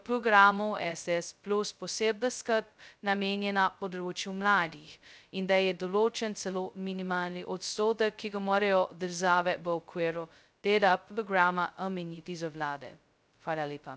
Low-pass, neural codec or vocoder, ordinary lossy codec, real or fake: none; codec, 16 kHz, 0.2 kbps, FocalCodec; none; fake